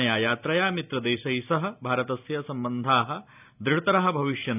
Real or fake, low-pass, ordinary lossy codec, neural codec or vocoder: real; 3.6 kHz; none; none